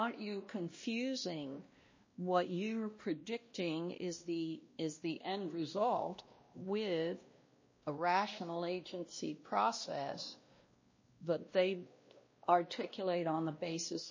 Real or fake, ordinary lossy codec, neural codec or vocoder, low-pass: fake; MP3, 32 kbps; codec, 16 kHz, 1 kbps, X-Codec, WavLM features, trained on Multilingual LibriSpeech; 7.2 kHz